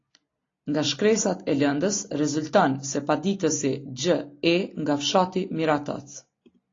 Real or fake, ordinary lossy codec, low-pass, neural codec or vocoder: real; AAC, 32 kbps; 7.2 kHz; none